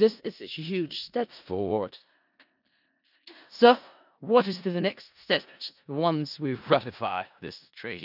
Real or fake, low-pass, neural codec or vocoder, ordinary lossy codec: fake; 5.4 kHz; codec, 16 kHz in and 24 kHz out, 0.4 kbps, LongCat-Audio-Codec, four codebook decoder; none